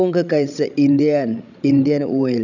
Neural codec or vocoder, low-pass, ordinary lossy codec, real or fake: codec, 16 kHz, 16 kbps, FreqCodec, larger model; 7.2 kHz; none; fake